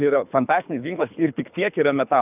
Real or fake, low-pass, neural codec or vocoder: fake; 3.6 kHz; codec, 24 kHz, 3 kbps, HILCodec